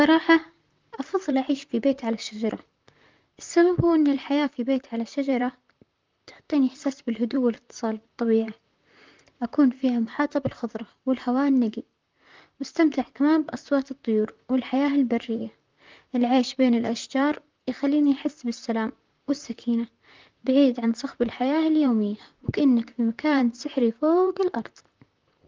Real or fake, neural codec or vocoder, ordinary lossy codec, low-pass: fake; vocoder, 44.1 kHz, 128 mel bands, Pupu-Vocoder; Opus, 24 kbps; 7.2 kHz